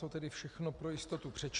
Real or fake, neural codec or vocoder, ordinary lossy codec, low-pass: real; none; Opus, 64 kbps; 10.8 kHz